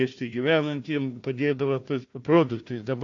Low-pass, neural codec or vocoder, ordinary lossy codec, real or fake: 7.2 kHz; codec, 16 kHz, 1 kbps, FunCodec, trained on Chinese and English, 50 frames a second; AAC, 48 kbps; fake